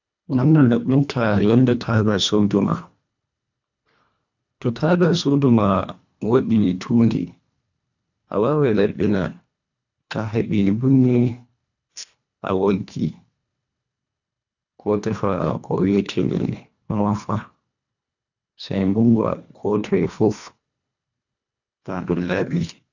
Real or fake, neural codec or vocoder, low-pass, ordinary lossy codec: fake; codec, 24 kHz, 1.5 kbps, HILCodec; 7.2 kHz; none